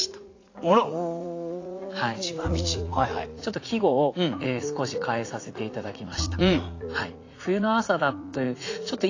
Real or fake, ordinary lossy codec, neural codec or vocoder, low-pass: fake; AAC, 32 kbps; vocoder, 44.1 kHz, 80 mel bands, Vocos; 7.2 kHz